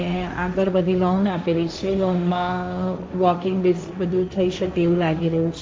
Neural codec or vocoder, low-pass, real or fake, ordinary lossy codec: codec, 16 kHz, 1.1 kbps, Voila-Tokenizer; none; fake; none